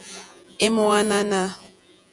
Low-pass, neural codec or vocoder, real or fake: 10.8 kHz; vocoder, 48 kHz, 128 mel bands, Vocos; fake